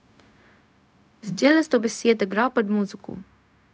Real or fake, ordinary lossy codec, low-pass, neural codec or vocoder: fake; none; none; codec, 16 kHz, 0.4 kbps, LongCat-Audio-Codec